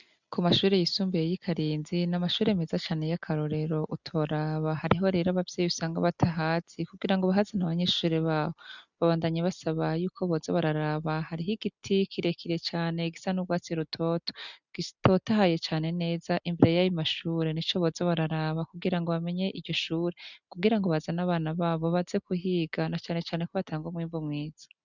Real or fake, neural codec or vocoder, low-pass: real; none; 7.2 kHz